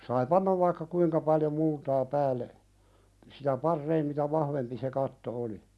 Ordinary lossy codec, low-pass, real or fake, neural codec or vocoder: none; none; real; none